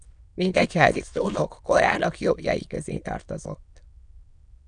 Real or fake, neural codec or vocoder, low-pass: fake; autoencoder, 22.05 kHz, a latent of 192 numbers a frame, VITS, trained on many speakers; 9.9 kHz